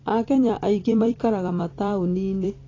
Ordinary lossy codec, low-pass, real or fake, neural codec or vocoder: AAC, 32 kbps; 7.2 kHz; fake; vocoder, 44.1 kHz, 128 mel bands every 256 samples, BigVGAN v2